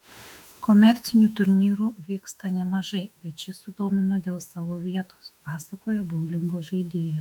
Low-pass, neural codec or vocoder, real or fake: 19.8 kHz; autoencoder, 48 kHz, 32 numbers a frame, DAC-VAE, trained on Japanese speech; fake